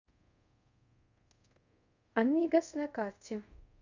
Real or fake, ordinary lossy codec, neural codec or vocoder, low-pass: fake; Opus, 64 kbps; codec, 24 kHz, 0.5 kbps, DualCodec; 7.2 kHz